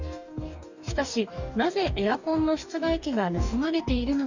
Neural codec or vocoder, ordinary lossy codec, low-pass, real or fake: codec, 44.1 kHz, 2.6 kbps, DAC; none; 7.2 kHz; fake